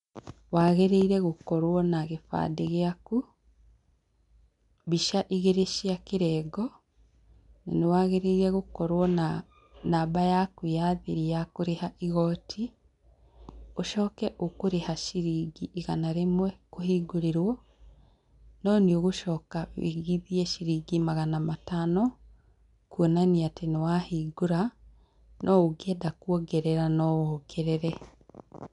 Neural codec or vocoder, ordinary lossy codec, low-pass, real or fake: none; none; 10.8 kHz; real